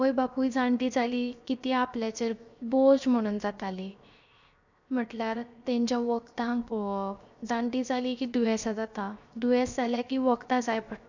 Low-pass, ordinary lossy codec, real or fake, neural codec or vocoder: 7.2 kHz; none; fake; codec, 16 kHz, 0.7 kbps, FocalCodec